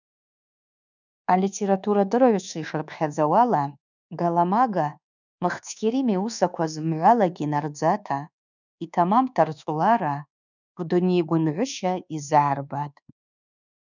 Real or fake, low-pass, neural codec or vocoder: fake; 7.2 kHz; codec, 24 kHz, 1.2 kbps, DualCodec